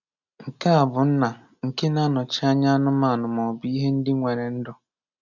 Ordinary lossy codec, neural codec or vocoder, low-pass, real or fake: none; none; 7.2 kHz; real